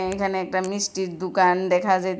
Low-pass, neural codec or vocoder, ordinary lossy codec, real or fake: none; none; none; real